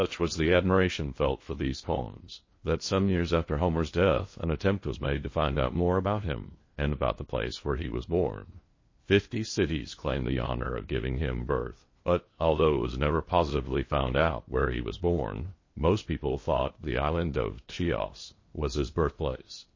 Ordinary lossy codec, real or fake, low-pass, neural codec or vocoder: MP3, 32 kbps; fake; 7.2 kHz; codec, 16 kHz in and 24 kHz out, 0.8 kbps, FocalCodec, streaming, 65536 codes